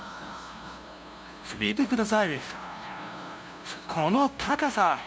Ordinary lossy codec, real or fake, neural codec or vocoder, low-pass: none; fake; codec, 16 kHz, 0.5 kbps, FunCodec, trained on LibriTTS, 25 frames a second; none